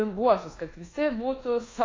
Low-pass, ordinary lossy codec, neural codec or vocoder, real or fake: 7.2 kHz; AAC, 32 kbps; codec, 24 kHz, 1.2 kbps, DualCodec; fake